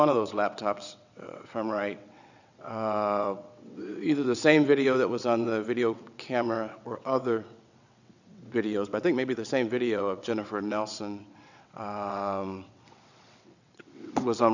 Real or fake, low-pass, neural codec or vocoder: fake; 7.2 kHz; vocoder, 22.05 kHz, 80 mel bands, WaveNeXt